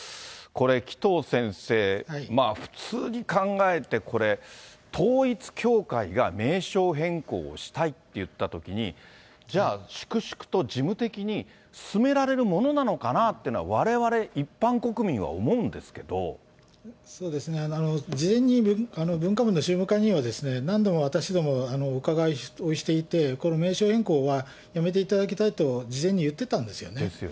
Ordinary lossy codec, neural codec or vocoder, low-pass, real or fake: none; none; none; real